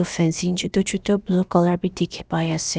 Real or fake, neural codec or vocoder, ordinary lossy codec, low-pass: fake; codec, 16 kHz, about 1 kbps, DyCAST, with the encoder's durations; none; none